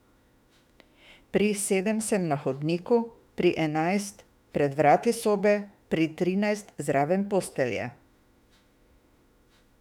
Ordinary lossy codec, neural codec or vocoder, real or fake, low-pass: none; autoencoder, 48 kHz, 32 numbers a frame, DAC-VAE, trained on Japanese speech; fake; 19.8 kHz